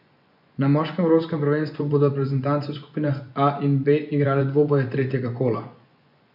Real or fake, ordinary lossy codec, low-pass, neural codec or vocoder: fake; none; 5.4 kHz; vocoder, 24 kHz, 100 mel bands, Vocos